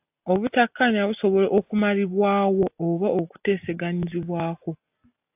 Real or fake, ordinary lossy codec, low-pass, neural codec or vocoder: real; AAC, 32 kbps; 3.6 kHz; none